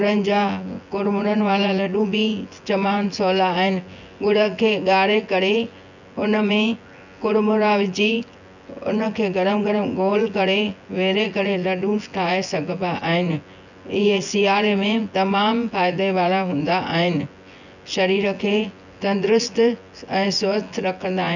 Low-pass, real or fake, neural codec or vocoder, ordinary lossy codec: 7.2 kHz; fake; vocoder, 24 kHz, 100 mel bands, Vocos; none